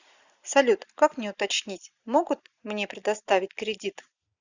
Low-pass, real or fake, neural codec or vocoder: 7.2 kHz; real; none